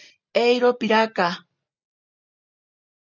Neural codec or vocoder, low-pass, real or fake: none; 7.2 kHz; real